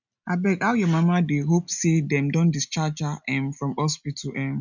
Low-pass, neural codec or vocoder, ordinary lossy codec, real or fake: 7.2 kHz; none; none; real